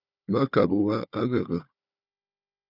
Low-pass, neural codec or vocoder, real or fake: 5.4 kHz; codec, 16 kHz, 4 kbps, FunCodec, trained on Chinese and English, 50 frames a second; fake